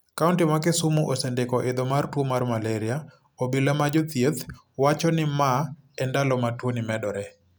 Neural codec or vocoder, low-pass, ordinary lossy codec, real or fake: none; none; none; real